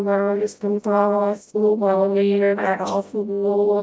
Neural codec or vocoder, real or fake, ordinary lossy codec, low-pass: codec, 16 kHz, 0.5 kbps, FreqCodec, smaller model; fake; none; none